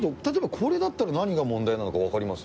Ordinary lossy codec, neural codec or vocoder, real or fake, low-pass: none; none; real; none